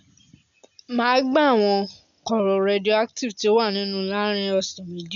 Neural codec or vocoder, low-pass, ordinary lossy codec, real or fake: none; 7.2 kHz; none; real